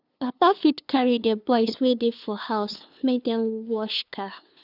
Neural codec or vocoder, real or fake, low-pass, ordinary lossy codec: codec, 16 kHz, 2 kbps, FunCodec, trained on LibriTTS, 25 frames a second; fake; 5.4 kHz; none